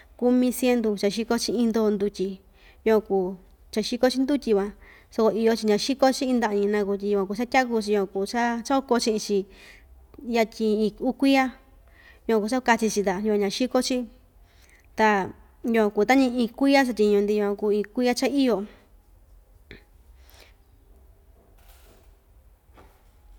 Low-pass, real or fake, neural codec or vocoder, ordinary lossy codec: 19.8 kHz; real; none; none